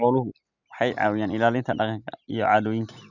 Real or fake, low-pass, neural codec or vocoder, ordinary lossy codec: real; 7.2 kHz; none; none